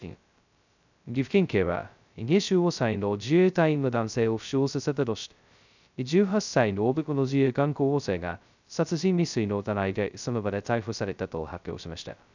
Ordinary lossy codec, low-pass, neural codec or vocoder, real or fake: none; 7.2 kHz; codec, 16 kHz, 0.2 kbps, FocalCodec; fake